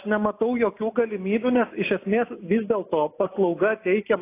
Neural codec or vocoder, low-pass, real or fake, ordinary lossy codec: none; 3.6 kHz; real; AAC, 24 kbps